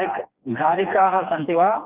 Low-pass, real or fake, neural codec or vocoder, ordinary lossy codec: 3.6 kHz; fake; codec, 16 kHz, 2 kbps, FreqCodec, smaller model; Opus, 32 kbps